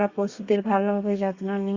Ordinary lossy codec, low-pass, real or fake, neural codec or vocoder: Opus, 64 kbps; 7.2 kHz; fake; codec, 32 kHz, 1.9 kbps, SNAC